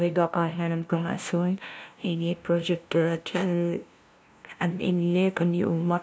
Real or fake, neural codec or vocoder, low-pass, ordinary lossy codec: fake; codec, 16 kHz, 0.5 kbps, FunCodec, trained on LibriTTS, 25 frames a second; none; none